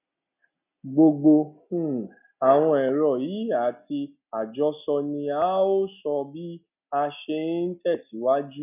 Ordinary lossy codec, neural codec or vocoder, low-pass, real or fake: none; none; 3.6 kHz; real